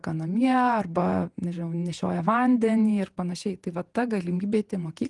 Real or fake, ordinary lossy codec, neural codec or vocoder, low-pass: fake; Opus, 24 kbps; vocoder, 48 kHz, 128 mel bands, Vocos; 10.8 kHz